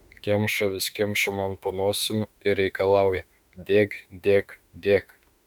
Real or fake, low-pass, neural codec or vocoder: fake; 19.8 kHz; autoencoder, 48 kHz, 32 numbers a frame, DAC-VAE, trained on Japanese speech